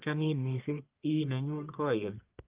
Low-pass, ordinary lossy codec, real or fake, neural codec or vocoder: 3.6 kHz; Opus, 32 kbps; fake; codec, 44.1 kHz, 1.7 kbps, Pupu-Codec